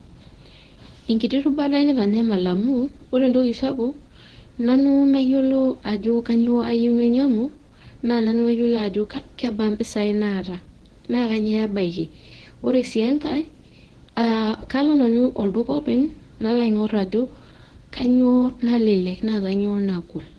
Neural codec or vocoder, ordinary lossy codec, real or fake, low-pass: codec, 24 kHz, 0.9 kbps, WavTokenizer, medium speech release version 1; Opus, 16 kbps; fake; 10.8 kHz